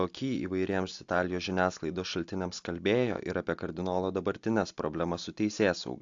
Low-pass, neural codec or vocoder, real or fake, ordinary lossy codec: 7.2 kHz; none; real; AAC, 64 kbps